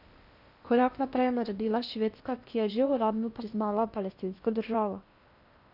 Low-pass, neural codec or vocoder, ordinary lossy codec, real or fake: 5.4 kHz; codec, 16 kHz in and 24 kHz out, 0.8 kbps, FocalCodec, streaming, 65536 codes; none; fake